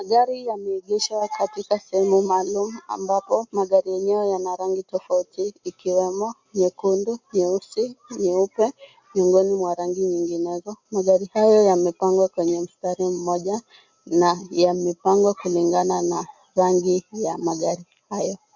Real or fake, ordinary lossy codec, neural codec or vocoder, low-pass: real; MP3, 48 kbps; none; 7.2 kHz